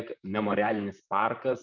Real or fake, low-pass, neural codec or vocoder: fake; 7.2 kHz; vocoder, 44.1 kHz, 128 mel bands, Pupu-Vocoder